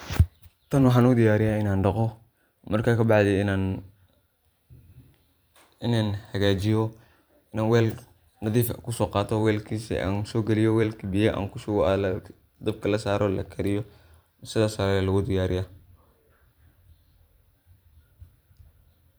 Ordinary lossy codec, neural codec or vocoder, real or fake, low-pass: none; vocoder, 44.1 kHz, 128 mel bands every 512 samples, BigVGAN v2; fake; none